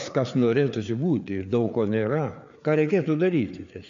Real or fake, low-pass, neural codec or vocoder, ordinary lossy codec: fake; 7.2 kHz; codec, 16 kHz, 4 kbps, FreqCodec, larger model; AAC, 64 kbps